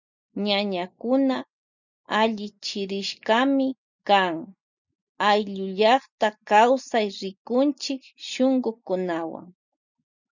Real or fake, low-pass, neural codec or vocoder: real; 7.2 kHz; none